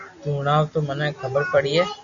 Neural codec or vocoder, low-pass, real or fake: none; 7.2 kHz; real